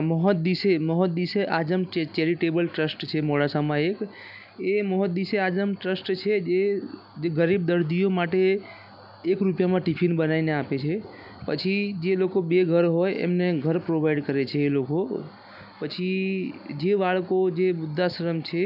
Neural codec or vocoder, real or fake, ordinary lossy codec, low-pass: none; real; none; 5.4 kHz